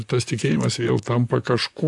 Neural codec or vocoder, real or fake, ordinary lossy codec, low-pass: vocoder, 44.1 kHz, 128 mel bands, Pupu-Vocoder; fake; MP3, 96 kbps; 10.8 kHz